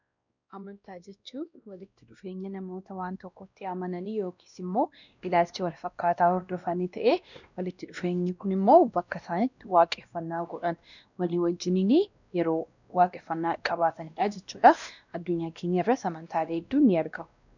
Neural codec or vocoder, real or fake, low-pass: codec, 16 kHz, 1 kbps, X-Codec, WavLM features, trained on Multilingual LibriSpeech; fake; 7.2 kHz